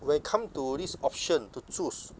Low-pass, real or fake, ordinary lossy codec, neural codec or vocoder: none; real; none; none